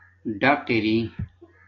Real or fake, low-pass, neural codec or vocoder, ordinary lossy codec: real; 7.2 kHz; none; AAC, 32 kbps